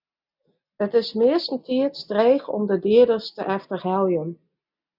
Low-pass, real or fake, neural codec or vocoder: 5.4 kHz; real; none